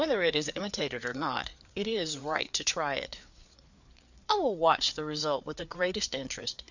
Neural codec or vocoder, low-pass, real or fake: codec, 16 kHz, 4 kbps, FreqCodec, larger model; 7.2 kHz; fake